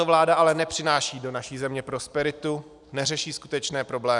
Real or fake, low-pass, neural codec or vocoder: real; 10.8 kHz; none